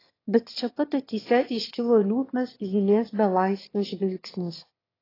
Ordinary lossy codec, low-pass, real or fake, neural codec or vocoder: AAC, 24 kbps; 5.4 kHz; fake; autoencoder, 22.05 kHz, a latent of 192 numbers a frame, VITS, trained on one speaker